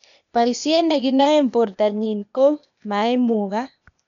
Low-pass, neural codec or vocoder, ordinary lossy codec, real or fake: 7.2 kHz; codec, 16 kHz, 0.8 kbps, ZipCodec; none; fake